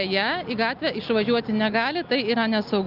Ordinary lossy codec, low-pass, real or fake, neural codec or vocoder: Opus, 32 kbps; 5.4 kHz; real; none